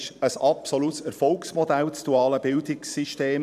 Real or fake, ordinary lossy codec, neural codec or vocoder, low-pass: fake; none; vocoder, 44.1 kHz, 128 mel bands every 512 samples, BigVGAN v2; 14.4 kHz